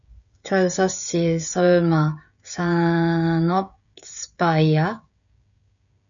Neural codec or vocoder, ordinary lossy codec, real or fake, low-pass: codec, 16 kHz, 16 kbps, FreqCodec, smaller model; MP3, 96 kbps; fake; 7.2 kHz